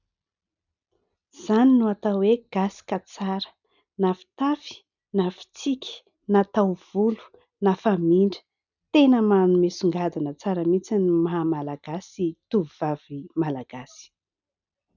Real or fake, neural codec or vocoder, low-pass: real; none; 7.2 kHz